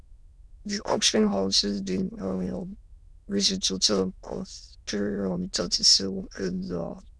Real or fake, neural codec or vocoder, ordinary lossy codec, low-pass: fake; autoencoder, 22.05 kHz, a latent of 192 numbers a frame, VITS, trained on many speakers; none; none